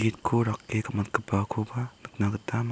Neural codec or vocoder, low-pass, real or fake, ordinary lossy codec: none; none; real; none